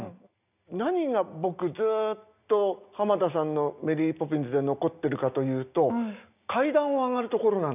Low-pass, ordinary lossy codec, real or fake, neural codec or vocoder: 3.6 kHz; none; real; none